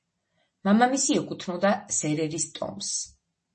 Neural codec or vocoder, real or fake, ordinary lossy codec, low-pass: none; real; MP3, 32 kbps; 10.8 kHz